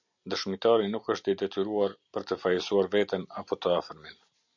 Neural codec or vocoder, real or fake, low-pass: none; real; 7.2 kHz